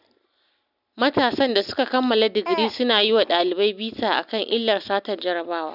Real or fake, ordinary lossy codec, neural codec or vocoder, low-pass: real; none; none; 5.4 kHz